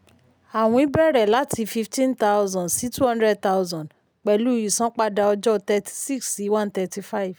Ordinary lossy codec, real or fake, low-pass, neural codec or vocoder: none; real; none; none